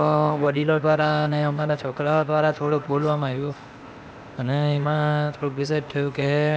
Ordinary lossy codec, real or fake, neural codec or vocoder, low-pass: none; fake; codec, 16 kHz, 0.8 kbps, ZipCodec; none